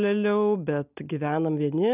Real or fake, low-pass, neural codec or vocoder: real; 3.6 kHz; none